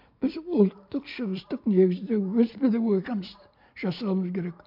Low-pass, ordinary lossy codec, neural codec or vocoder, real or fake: 5.4 kHz; MP3, 48 kbps; none; real